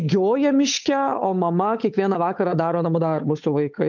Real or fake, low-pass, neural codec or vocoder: fake; 7.2 kHz; codec, 16 kHz, 8 kbps, FunCodec, trained on Chinese and English, 25 frames a second